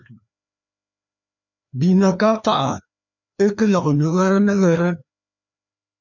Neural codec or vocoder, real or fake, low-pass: codec, 16 kHz, 2 kbps, FreqCodec, larger model; fake; 7.2 kHz